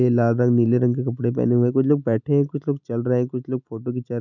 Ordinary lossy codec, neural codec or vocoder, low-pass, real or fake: none; none; 7.2 kHz; real